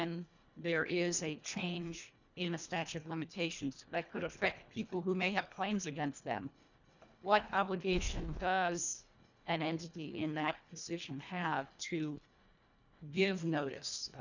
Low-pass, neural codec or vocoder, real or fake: 7.2 kHz; codec, 24 kHz, 1.5 kbps, HILCodec; fake